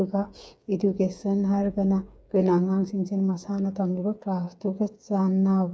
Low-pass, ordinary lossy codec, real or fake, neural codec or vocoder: none; none; fake; codec, 16 kHz, 8 kbps, FreqCodec, smaller model